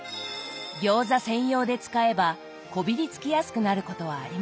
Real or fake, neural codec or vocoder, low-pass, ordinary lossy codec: real; none; none; none